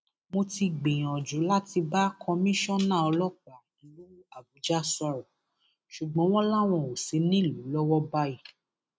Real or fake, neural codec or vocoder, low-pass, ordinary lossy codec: real; none; none; none